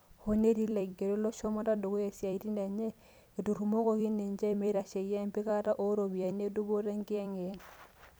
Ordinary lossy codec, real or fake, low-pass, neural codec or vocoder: none; fake; none; vocoder, 44.1 kHz, 128 mel bands every 256 samples, BigVGAN v2